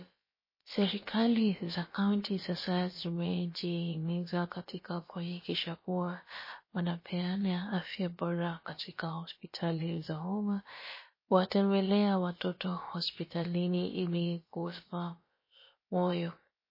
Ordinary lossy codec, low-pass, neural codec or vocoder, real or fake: MP3, 24 kbps; 5.4 kHz; codec, 16 kHz, about 1 kbps, DyCAST, with the encoder's durations; fake